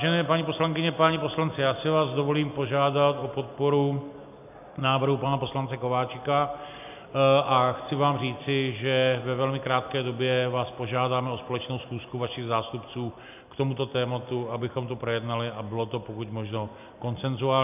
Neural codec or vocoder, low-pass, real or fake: none; 3.6 kHz; real